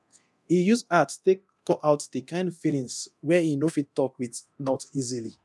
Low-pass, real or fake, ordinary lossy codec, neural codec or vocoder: none; fake; none; codec, 24 kHz, 0.9 kbps, DualCodec